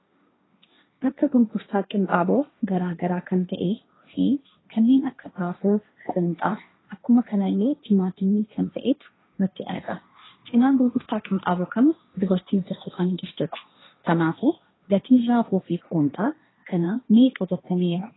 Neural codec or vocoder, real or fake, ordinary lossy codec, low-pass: codec, 16 kHz, 1.1 kbps, Voila-Tokenizer; fake; AAC, 16 kbps; 7.2 kHz